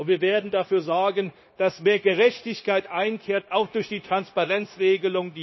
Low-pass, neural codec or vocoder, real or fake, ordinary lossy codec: 7.2 kHz; codec, 16 kHz, 0.9 kbps, LongCat-Audio-Codec; fake; MP3, 24 kbps